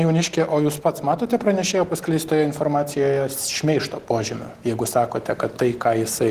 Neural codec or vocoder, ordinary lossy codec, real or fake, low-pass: none; Opus, 16 kbps; real; 14.4 kHz